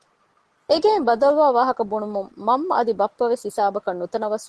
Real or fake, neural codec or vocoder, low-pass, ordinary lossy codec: real; none; 10.8 kHz; Opus, 16 kbps